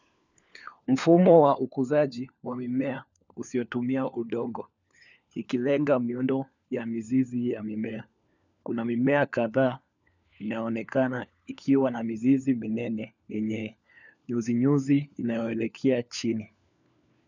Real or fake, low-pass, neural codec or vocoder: fake; 7.2 kHz; codec, 16 kHz, 4 kbps, FunCodec, trained on LibriTTS, 50 frames a second